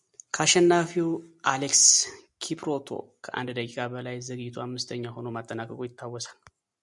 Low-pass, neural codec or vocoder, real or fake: 10.8 kHz; none; real